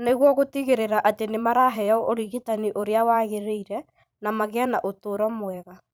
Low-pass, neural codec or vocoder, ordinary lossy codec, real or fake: none; vocoder, 44.1 kHz, 128 mel bands every 512 samples, BigVGAN v2; none; fake